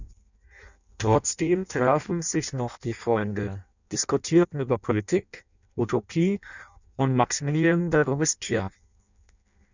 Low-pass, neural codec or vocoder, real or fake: 7.2 kHz; codec, 16 kHz in and 24 kHz out, 0.6 kbps, FireRedTTS-2 codec; fake